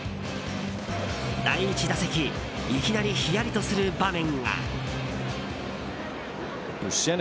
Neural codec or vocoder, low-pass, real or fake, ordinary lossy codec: none; none; real; none